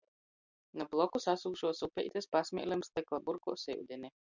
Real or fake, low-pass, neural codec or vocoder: real; 7.2 kHz; none